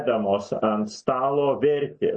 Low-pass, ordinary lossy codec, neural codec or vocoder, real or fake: 9.9 kHz; MP3, 32 kbps; none; real